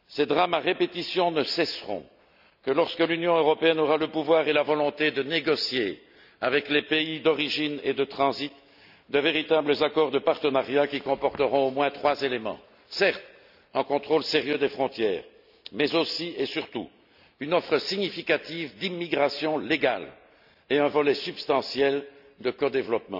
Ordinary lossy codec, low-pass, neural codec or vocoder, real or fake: none; 5.4 kHz; none; real